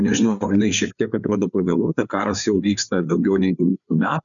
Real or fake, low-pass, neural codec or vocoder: fake; 7.2 kHz; codec, 16 kHz, 4 kbps, FreqCodec, larger model